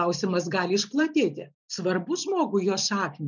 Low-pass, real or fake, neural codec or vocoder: 7.2 kHz; real; none